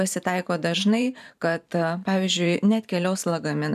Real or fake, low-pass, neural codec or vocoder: fake; 14.4 kHz; vocoder, 44.1 kHz, 128 mel bands every 512 samples, BigVGAN v2